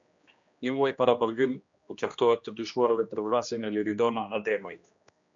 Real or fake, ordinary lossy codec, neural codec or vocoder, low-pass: fake; MP3, 64 kbps; codec, 16 kHz, 1 kbps, X-Codec, HuBERT features, trained on general audio; 7.2 kHz